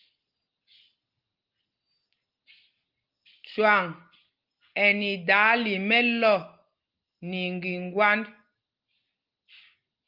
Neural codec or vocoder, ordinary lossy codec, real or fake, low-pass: none; Opus, 32 kbps; real; 5.4 kHz